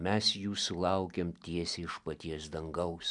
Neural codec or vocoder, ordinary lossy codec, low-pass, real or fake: none; MP3, 96 kbps; 10.8 kHz; real